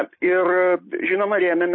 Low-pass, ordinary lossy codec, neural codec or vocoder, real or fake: 7.2 kHz; MP3, 24 kbps; none; real